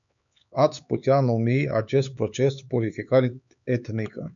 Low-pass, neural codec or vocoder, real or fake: 7.2 kHz; codec, 16 kHz, 4 kbps, X-Codec, HuBERT features, trained on LibriSpeech; fake